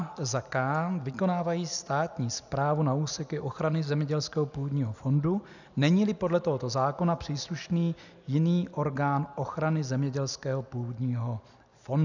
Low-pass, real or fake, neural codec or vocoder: 7.2 kHz; real; none